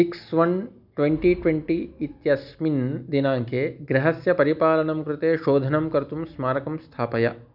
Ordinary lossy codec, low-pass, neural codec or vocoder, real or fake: none; 5.4 kHz; none; real